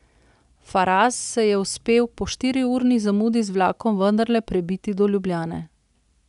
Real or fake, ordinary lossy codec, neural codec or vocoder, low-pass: real; none; none; 10.8 kHz